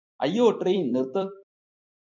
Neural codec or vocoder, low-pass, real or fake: vocoder, 44.1 kHz, 128 mel bands every 256 samples, BigVGAN v2; 7.2 kHz; fake